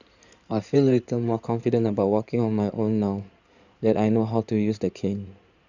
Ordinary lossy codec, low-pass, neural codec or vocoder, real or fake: none; 7.2 kHz; codec, 16 kHz in and 24 kHz out, 2.2 kbps, FireRedTTS-2 codec; fake